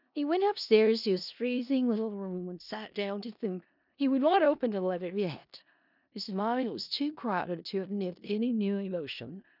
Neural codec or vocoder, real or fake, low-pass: codec, 16 kHz in and 24 kHz out, 0.4 kbps, LongCat-Audio-Codec, four codebook decoder; fake; 5.4 kHz